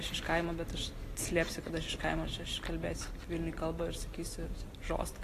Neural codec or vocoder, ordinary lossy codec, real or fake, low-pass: none; AAC, 48 kbps; real; 14.4 kHz